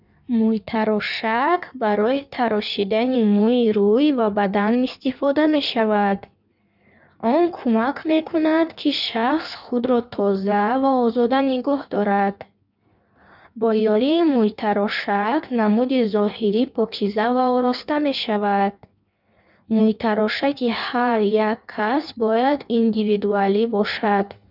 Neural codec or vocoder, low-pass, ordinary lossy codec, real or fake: codec, 16 kHz in and 24 kHz out, 1.1 kbps, FireRedTTS-2 codec; 5.4 kHz; none; fake